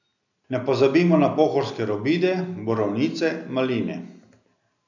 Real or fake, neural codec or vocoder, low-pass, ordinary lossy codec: real; none; 7.2 kHz; none